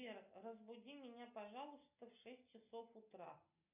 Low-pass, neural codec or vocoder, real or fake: 3.6 kHz; none; real